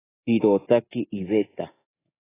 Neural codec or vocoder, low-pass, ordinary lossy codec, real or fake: codec, 24 kHz, 3.1 kbps, DualCodec; 3.6 kHz; AAC, 16 kbps; fake